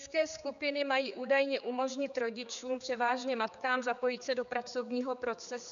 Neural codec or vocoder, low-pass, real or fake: codec, 16 kHz, 4 kbps, X-Codec, HuBERT features, trained on general audio; 7.2 kHz; fake